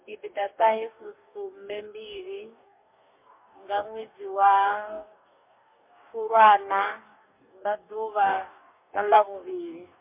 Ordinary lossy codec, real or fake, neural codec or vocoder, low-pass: MP3, 24 kbps; fake; codec, 44.1 kHz, 2.6 kbps, DAC; 3.6 kHz